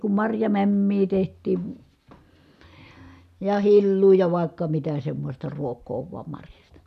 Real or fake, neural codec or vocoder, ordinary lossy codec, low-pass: real; none; none; 14.4 kHz